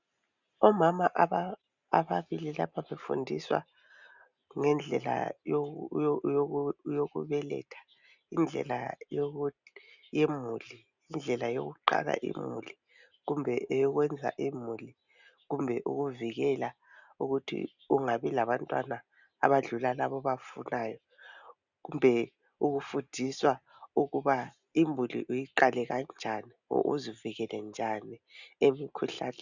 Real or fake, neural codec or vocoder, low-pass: real; none; 7.2 kHz